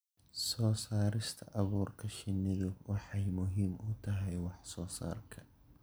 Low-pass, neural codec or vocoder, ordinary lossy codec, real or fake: none; none; none; real